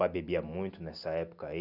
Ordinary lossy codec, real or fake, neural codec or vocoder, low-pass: none; real; none; 5.4 kHz